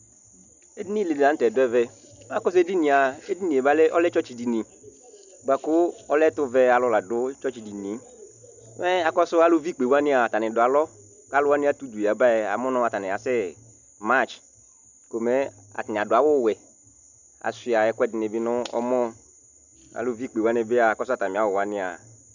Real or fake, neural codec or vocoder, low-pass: real; none; 7.2 kHz